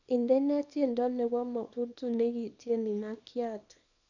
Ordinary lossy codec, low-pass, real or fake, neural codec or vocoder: none; 7.2 kHz; fake; codec, 24 kHz, 0.9 kbps, WavTokenizer, small release